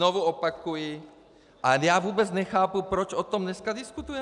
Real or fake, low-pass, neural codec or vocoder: real; 10.8 kHz; none